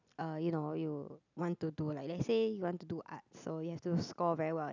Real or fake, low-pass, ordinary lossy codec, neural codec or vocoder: real; 7.2 kHz; none; none